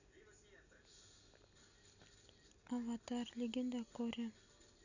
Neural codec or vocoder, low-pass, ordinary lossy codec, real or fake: none; 7.2 kHz; none; real